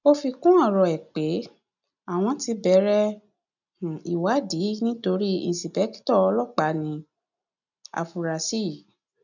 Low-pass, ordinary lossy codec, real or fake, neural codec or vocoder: 7.2 kHz; none; real; none